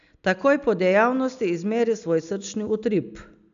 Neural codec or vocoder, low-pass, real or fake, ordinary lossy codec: none; 7.2 kHz; real; none